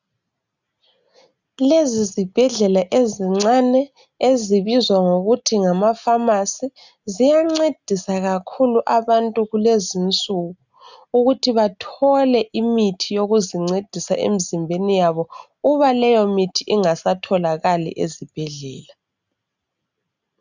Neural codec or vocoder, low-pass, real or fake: none; 7.2 kHz; real